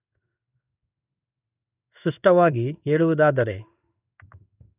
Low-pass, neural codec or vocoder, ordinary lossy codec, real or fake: 3.6 kHz; codec, 16 kHz in and 24 kHz out, 1 kbps, XY-Tokenizer; none; fake